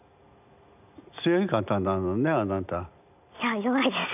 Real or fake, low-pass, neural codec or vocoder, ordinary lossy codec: real; 3.6 kHz; none; none